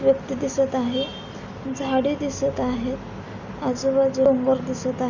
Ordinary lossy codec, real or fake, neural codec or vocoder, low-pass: none; real; none; 7.2 kHz